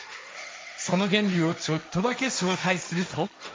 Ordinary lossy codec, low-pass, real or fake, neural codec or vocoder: none; none; fake; codec, 16 kHz, 1.1 kbps, Voila-Tokenizer